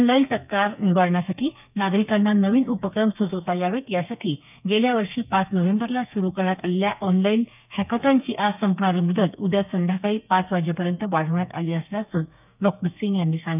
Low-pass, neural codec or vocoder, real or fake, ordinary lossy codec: 3.6 kHz; codec, 32 kHz, 1.9 kbps, SNAC; fake; none